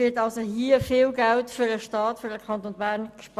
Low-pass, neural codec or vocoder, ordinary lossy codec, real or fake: 14.4 kHz; none; Opus, 64 kbps; real